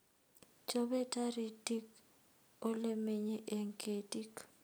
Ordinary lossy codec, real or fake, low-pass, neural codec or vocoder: none; real; none; none